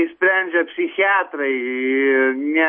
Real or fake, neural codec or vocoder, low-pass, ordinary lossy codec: real; none; 9.9 kHz; MP3, 32 kbps